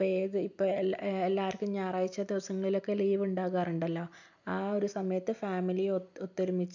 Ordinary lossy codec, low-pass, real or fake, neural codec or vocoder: none; 7.2 kHz; real; none